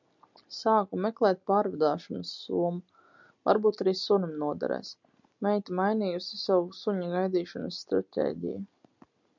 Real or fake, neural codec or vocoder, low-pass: real; none; 7.2 kHz